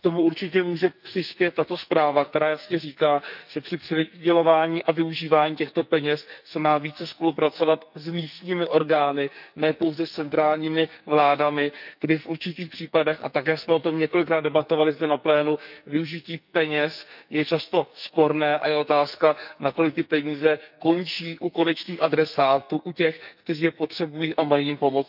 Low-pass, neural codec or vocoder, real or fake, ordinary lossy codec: 5.4 kHz; codec, 44.1 kHz, 2.6 kbps, SNAC; fake; none